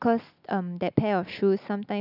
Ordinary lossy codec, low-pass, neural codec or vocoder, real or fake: none; 5.4 kHz; none; real